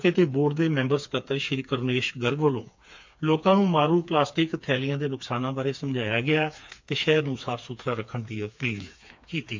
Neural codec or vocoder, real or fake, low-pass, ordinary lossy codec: codec, 16 kHz, 4 kbps, FreqCodec, smaller model; fake; 7.2 kHz; MP3, 64 kbps